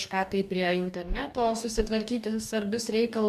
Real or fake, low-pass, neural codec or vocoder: fake; 14.4 kHz; codec, 44.1 kHz, 2.6 kbps, DAC